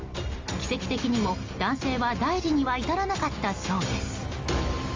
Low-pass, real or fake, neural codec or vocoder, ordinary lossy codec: 7.2 kHz; real; none; Opus, 32 kbps